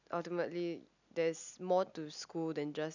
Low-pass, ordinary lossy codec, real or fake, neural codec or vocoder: 7.2 kHz; none; real; none